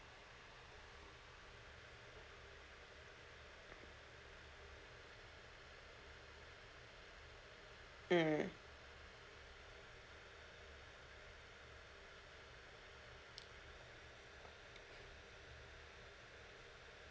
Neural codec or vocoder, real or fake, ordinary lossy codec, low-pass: none; real; none; none